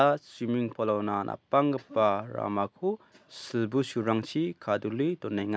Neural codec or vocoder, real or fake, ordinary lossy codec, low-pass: none; real; none; none